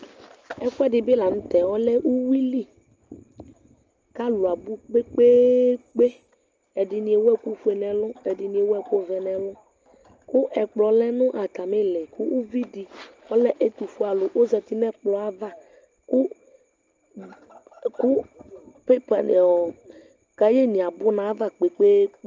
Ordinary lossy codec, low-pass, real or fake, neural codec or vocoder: Opus, 24 kbps; 7.2 kHz; real; none